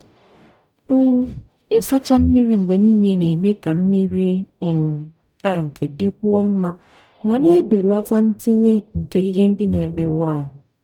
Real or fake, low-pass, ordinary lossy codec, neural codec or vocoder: fake; 19.8 kHz; none; codec, 44.1 kHz, 0.9 kbps, DAC